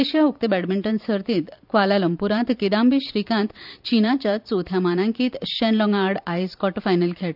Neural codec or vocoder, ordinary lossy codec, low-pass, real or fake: vocoder, 44.1 kHz, 128 mel bands every 512 samples, BigVGAN v2; none; 5.4 kHz; fake